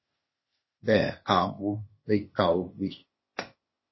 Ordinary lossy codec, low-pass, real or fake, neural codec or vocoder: MP3, 24 kbps; 7.2 kHz; fake; codec, 16 kHz, 0.8 kbps, ZipCodec